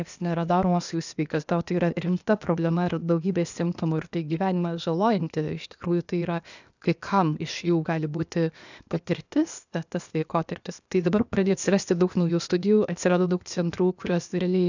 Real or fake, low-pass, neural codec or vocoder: fake; 7.2 kHz; codec, 16 kHz, 0.8 kbps, ZipCodec